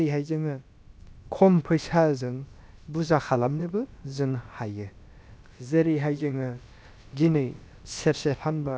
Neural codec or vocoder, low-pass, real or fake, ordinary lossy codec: codec, 16 kHz, about 1 kbps, DyCAST, with the encoder's durations; none; fake; none